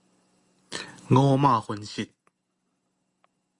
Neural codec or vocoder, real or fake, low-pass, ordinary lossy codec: none; real; 10.8 kHz; Opus, 64 kbps